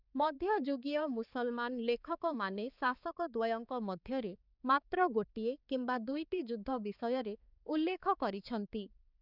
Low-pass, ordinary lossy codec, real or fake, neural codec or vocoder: 5.4 kHz; none; fake; codec, 16 kHz, 4 kbps, X-Codec, HuBERT features, trained on balanced general audio